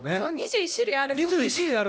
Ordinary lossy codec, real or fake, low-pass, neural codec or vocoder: none; fake; none; codec, 16 kHz, 1 kbps, X-Codec, HuBERT features, trained on LibriSpeech